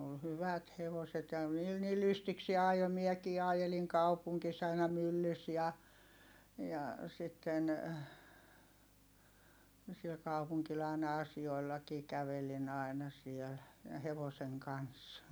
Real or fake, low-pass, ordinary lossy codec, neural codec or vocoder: real; none; none; none